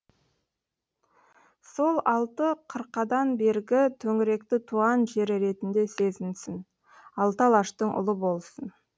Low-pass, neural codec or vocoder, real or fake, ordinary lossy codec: none; none; real; none